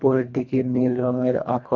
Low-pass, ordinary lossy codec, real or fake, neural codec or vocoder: 7.2 kHz; none; fake; codec, 24 kHz, 1.5 kbps, HILCodec